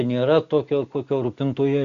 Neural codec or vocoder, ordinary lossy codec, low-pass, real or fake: none; Opus, 64 kbps; 7.2 kHz; real